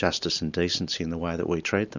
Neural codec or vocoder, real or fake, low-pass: none; real; 7.2 kHz